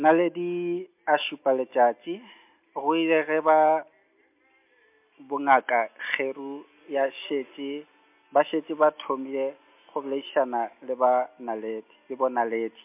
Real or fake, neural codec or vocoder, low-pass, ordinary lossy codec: real; none; 3.6 kHz; MP3, 32 kbps